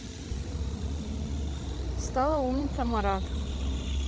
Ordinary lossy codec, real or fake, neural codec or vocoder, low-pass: none; fake; codec, 16 kHz, 16 kbps, FreqCodec, larger model; none